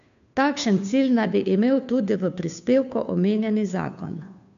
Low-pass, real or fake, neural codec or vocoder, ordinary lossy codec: 7.2 kHz; fake; codec, 16 kHz, 2 kbps, FunCodec, trained on Chinese and English, 25 frames a second; none